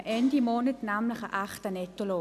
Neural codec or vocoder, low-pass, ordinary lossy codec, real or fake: none; 14.4 kHz; none; real